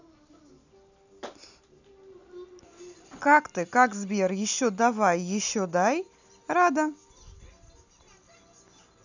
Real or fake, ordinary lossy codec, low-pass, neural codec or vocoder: real; none; 7.2 kHz; none